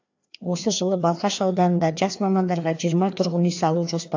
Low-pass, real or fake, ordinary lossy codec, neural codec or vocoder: 7.2 kHz; fake; AAC, 48 kbps; codec, 16 kHz, 2 kbps, FreqCodec, larger model